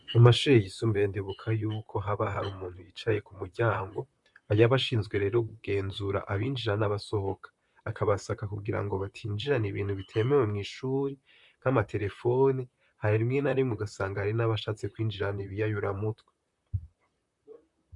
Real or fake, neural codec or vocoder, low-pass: fake; vocoder, 44.1 kHz, 128 mel bands, Pupu-Vocoder; 10.8 kHz